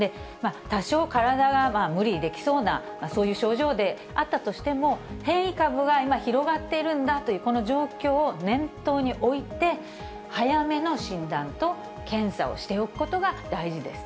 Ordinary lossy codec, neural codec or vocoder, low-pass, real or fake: none; none; none; real